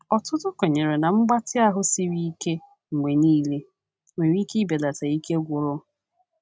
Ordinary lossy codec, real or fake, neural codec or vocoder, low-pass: none; real; none; none